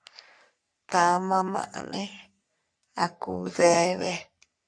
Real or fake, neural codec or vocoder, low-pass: fake; codec, 44.1 kHz, 3.4 kbps, Pupu-Codec; 9.9 kHz